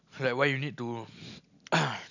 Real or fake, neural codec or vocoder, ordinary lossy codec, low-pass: real; none; none; 7.2 kHz